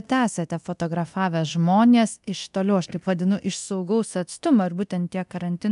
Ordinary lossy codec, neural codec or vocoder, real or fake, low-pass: AAC, 96 kbps; codec, 24 kHz, 0.9 kbps, DualCodec; fake; 10.8 kHz